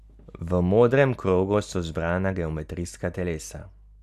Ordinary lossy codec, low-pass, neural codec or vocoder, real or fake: none; 14.4 kHz; none; real